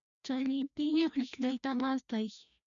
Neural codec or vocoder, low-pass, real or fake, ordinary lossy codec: codec, 16 kHz, 1 kbps, FreqCodec, larger model; 7.2 kHz; fake; MP3, 96 kbps